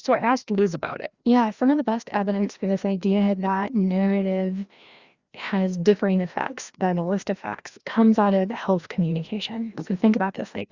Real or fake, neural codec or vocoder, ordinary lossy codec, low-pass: fake; codec, 16 kHz, 1 kbps, FreqCodec, larger model; Opus, 64 kbps; 7.2 kHz